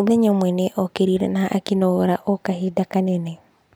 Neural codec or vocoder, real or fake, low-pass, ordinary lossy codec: none; real; none; none